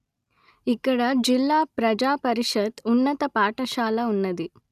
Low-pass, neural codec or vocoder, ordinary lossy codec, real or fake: 14.4 kHz; none; none; real